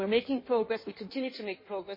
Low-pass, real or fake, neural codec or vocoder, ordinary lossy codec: 5.4 kHz; fake; codec, 16 kHz in and 24 kHz out, 1.1 kbps, FireRedTTS-2 codec; MP3, 24 kbps